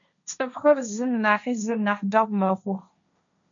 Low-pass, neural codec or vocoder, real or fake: 7.2 kHz; codec, 16 kHz, 1.1 kbps, Voila-Tokenizer; fake